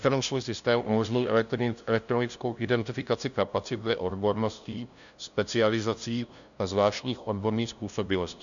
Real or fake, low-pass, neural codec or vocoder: fake; 7.2 kHz; codec, 16 kHz, 0.5 kbps, FunCodec, trained on LibriTTS, 25 frames a second